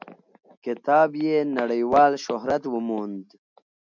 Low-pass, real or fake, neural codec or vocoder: 7.2 kHz; real; none